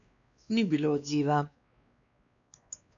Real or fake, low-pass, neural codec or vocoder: fake; 7.2 kHz; codec, 16 kHz, 2 kbps, X-Codec, WavLM features, trained on Multilingual LibriSpeech